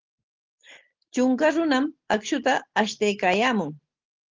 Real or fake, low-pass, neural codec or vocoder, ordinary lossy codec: real; 7.2 kHz; none; Opus, 16 kbps